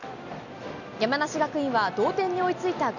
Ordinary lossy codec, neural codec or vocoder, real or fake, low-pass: none; none; real; 7.2 kHz